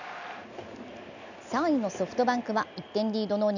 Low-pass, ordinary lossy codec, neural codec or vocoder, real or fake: 7.2 kHz; none; none; real